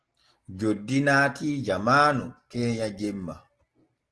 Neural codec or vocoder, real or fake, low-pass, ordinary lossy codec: none; real; 10.8 kHz; Opus, 16 kbps